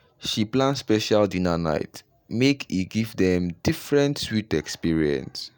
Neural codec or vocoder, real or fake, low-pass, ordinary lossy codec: none; real; none; none